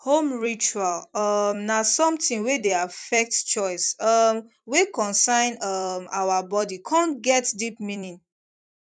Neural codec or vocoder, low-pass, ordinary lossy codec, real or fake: vocoder, 44.1 kHz, 128 mel bands every 256 samples, BigVGAN v2; 9.9 kHz; none; fake